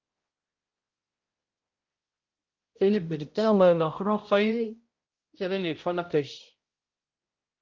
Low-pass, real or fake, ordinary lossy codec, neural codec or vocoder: 7.2 kHz; fake; Opus, 32 kbps; codec, 16 kHz, 0.5 kbps, X-Codec, HuBERT features, trained on balanced general audio